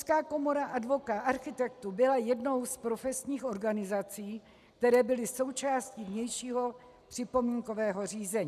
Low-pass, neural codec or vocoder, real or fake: 14.4 kHz; none; real